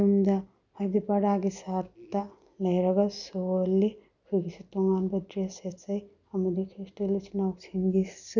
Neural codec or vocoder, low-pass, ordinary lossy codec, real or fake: none; 7.2 kHz; none; real